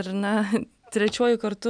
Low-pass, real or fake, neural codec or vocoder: 9.9 kHz; real; none